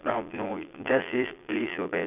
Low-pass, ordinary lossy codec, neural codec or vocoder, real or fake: 3.6 kHz; none; vocoder, 22.05 kHz, 80 mel bands, Vocos; fake